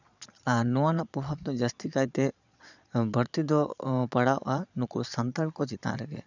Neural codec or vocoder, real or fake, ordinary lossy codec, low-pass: vocoder, 22.05 kHz, 80 mel bands, Vocos; fake; none; 7.2 kHz